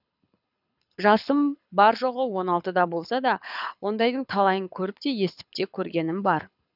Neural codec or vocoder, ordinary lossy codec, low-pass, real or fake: codec, 24 kHz, 6 kbps, HILCodec; none; 5.4 kHz; fake